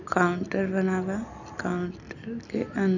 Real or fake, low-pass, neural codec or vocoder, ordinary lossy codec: real; 7.2 kHz; none; none